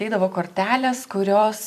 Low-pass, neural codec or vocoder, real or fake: 14.4 kHz; vocoder, 44.1 kHz, 128 mel bands every 256 samples, BigVGAN v2; fake